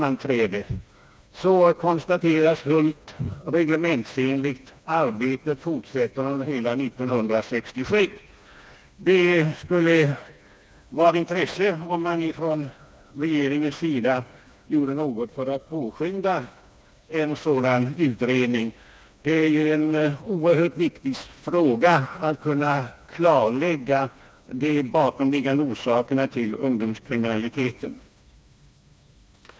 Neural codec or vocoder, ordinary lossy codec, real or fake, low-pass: codec, 16 kHz, 2 kbps, FreqCodec, smaller model; none; fake; none